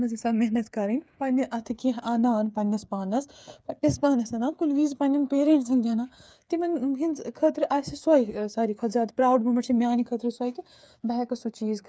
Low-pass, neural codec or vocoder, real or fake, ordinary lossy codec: none; codec, 16 kHz, 8 kbps, FreqCodec, smaller model; fake; none